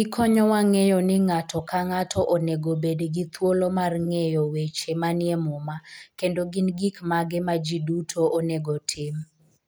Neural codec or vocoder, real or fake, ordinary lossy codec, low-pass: none; real; none; none